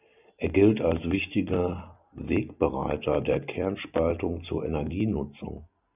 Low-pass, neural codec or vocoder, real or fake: 3.6 kHz; none; real